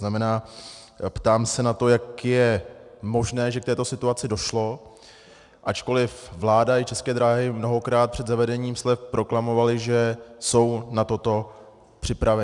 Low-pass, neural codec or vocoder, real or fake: 10.8 kHz; none; real